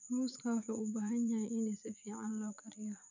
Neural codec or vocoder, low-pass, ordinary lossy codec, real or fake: vocoder, 24 kHz, 100 mel bands, Vocos; 7.2 kHz; none; fake